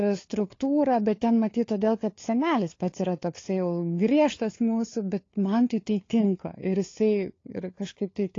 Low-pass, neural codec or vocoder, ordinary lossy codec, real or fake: 7.2 kHz; codec, 16 kHz, 4 kbps, FunCodec, trained on LibriTTS, 50 frames a second; AAC, 32 kbps; fake